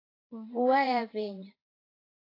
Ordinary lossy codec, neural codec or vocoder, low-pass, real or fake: AAC, 24 kbps; vocoder, 22.05 kHz, 80 mel bands, Vocos; 5.4 kHz; fake